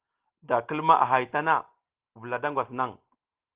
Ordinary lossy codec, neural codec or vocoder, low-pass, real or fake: Opus, 16 kbps; none; 3.6 kHz; real